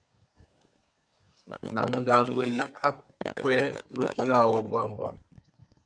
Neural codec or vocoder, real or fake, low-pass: codec, 24 kHz, 1 kbps, SNAC; fake; 9.9 kHz